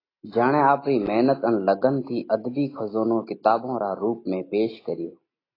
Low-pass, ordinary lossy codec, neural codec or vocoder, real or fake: 5.4 kHz; AAC, 24 kbps; none; real